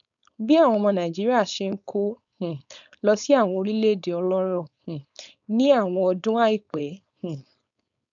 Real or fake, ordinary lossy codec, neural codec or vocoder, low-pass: fake; none; codec, 16 kHz, 4.8 kbps, FACodec; 7.2 kHz